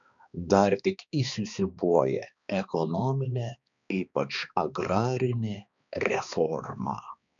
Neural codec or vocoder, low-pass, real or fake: codec, 16 kHz, 2 kbps, X-Codec, HuBERT features, trained on balanced general audio; 7.2 kHz; fake